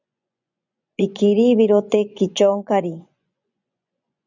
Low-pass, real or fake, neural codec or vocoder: 7.2 kHz; fake; vocoder, 24 kHz, 100 mel bands, Vocos